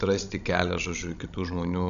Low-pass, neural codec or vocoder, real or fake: 7.2 kHz; codec, 16 kHz, 16 kbps, FreqCodec, larger model; fake